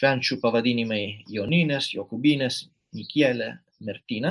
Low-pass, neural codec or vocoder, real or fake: 10.8 kHz; none; real